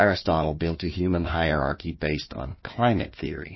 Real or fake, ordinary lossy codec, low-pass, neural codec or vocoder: fake; MP3, 24 kbps; 7.2 kHz; codec, 16 kHz, 1 kbps, FunCodec, trained on Chinese and English, 50 frames a second